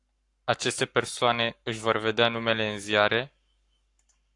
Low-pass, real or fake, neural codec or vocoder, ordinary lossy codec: 10.8 kHz; fake; codec, 44.1 kHz, 7.8 kbps, Pupu-Codec; AAC, 64 kbps